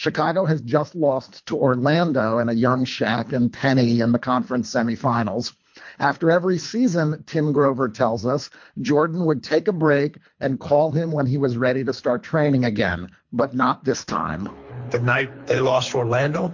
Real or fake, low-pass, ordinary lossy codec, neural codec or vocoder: fake; 7.2 kHz; MP3, 48 kbps; codec, 24 kHz, 3 kbps, HILCodec